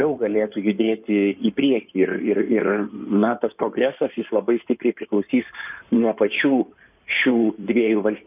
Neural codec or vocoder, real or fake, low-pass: codec, 16 kHz in and 24 kHz out, 2.2 kbps, FireRedTTS-2 codec; fake; 3.6 kHz